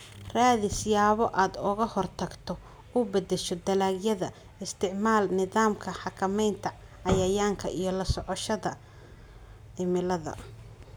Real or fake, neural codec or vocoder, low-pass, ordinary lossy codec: real; none; none; none